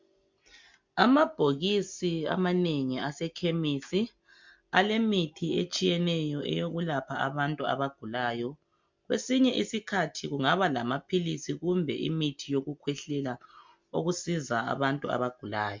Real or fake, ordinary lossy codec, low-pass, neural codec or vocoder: real; MP3, 64 kbps; 7.2 kHz; none